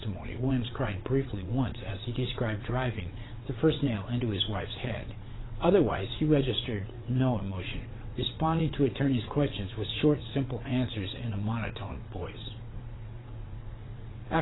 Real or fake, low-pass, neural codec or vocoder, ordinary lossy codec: fake; 7.2 kHz; vocoder, 22.05 kHz, 80 mel bands, WaveNeXt; AAC, 16 kbps